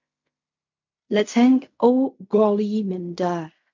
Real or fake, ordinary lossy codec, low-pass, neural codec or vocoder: fake; MP3, 48 kbps; 7.2 kHz; codec, 16 kHz in and 24 kHz out, 0.4 kbps, LongCat-Audio-Codec, fine tuned four codebook decoder